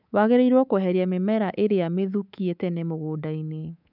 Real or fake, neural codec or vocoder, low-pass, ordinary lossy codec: fake; codec, 24 kHz, 3.1 kbps, DualCodec; 5.4 kHz; none